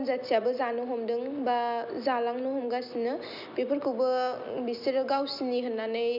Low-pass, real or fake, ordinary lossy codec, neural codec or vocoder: 5.4 kHz; real; none; none